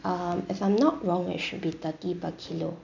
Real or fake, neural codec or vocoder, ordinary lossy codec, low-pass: real; none; none; 7.2 kHz